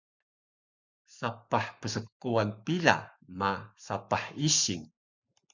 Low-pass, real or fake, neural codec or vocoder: 7.2 kHz; fake; codec, 44.1 kHz, 7.8 kbps, Pupu-Codec